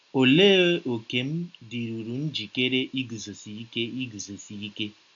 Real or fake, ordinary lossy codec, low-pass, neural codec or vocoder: real; AAC, 64 kbps; 7.2 kHz; none